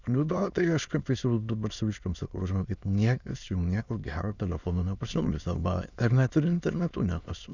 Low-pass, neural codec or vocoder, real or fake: 7.2 kHz; autoencoder, 22.05 kHz, a latent of 192 numbers a frame, VITS, trained on many speakers; fake